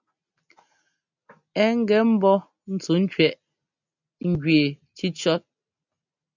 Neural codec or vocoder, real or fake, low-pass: none; real; 7.2 kHz